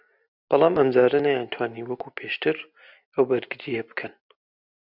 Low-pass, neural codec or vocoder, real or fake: 5.4 kHz; none; real